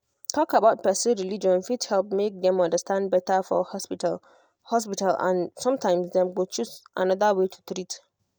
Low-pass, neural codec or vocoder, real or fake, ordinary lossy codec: none; none; real; none